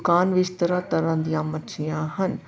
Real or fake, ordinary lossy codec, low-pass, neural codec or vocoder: real; none; none; none